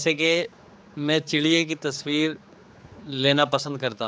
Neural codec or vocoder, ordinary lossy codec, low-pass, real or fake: codec, 16 kHz, 4 kbps, X-Codec, HuBERT features, trained on general audio; none; none; fake